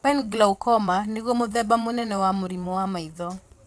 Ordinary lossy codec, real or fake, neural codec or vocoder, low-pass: none; fake; vocoder, 22.05 kHz, 80 mel bands, WaveNeXt; none